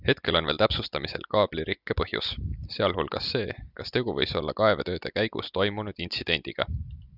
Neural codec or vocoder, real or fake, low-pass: none; real; 5.4 kHz